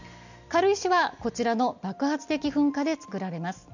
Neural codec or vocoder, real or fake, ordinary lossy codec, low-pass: none; real; none; 7.2 kHz